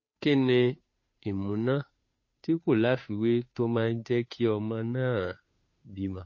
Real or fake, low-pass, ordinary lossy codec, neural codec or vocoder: fake; 7.2 kHz; MP3, 32 kbps; codec, 16 kHz, 2 kbps, FunCodec, trained on Chinese and English, 25 frames a second